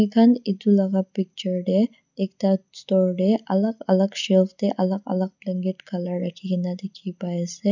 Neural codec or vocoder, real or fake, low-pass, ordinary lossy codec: none; real; 7.2 kHz; none